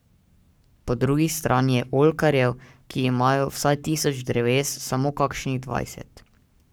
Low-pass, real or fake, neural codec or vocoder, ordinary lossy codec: none; fake; codec, 44.1 kHz, 7.8 kbps, Pupu-Codec; none